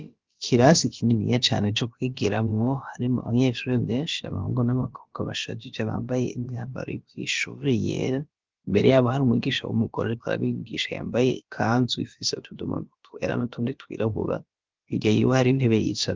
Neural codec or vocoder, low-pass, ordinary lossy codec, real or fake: codec, 16 kHz, about 1 kbps, DyCAST, with the encoder's durations; 7.2 kHz; Opus, 32 kbps; fake